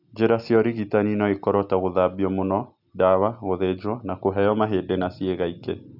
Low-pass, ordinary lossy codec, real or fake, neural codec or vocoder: 5.4 kHz; none; real; none